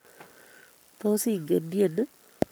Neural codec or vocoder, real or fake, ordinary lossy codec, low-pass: codec, 44.1 kHz, 7.8 kbps, Pupu-Codec; fake; none; none